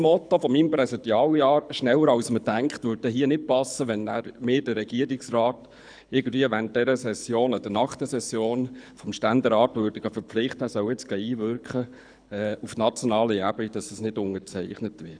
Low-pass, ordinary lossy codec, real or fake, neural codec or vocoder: 9.9 kHz; none; fake; codec, 24 kHz, 6 kbps, HILCodec